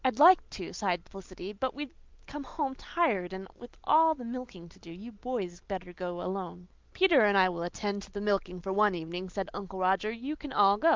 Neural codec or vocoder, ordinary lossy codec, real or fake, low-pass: none; Opus, 32 kbps; real; 7.2 kHz